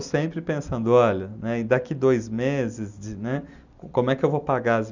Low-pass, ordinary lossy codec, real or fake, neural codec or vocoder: 7.2 kHz; none; real; none